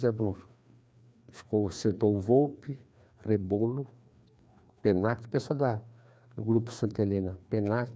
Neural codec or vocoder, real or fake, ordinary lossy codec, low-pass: codec, 16 kHz, 2 kbps, FreqCodec, larger model; fake; none; none